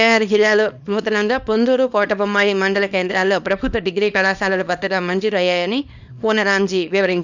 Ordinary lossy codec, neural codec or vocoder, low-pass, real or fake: none; codec, 24 kHz, 0.9 kbps, WavTokenizer, small release; 7.2 kHz; fake